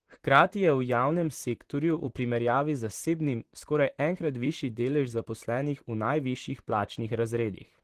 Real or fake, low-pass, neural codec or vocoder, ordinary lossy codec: fake; 14.4 kHz; vocoder, 44.1 kHz, 128 mel bands, Pupu-Vocoder; Opus, 16 kbps